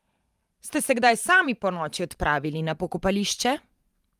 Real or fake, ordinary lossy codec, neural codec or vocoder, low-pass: fake; Opus, 32 kbps; vocoder, 44.1 kHz, 128 mel bands every 512 samples, BigVGAN v2; 14.4 kHz